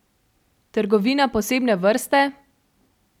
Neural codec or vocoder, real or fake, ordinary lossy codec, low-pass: none; real; none; 19.8 kHz